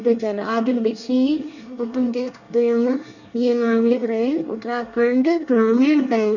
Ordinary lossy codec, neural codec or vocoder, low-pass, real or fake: none; codec, 24 kHz, 1 kbps, SNAC; 7.2 kHz; fake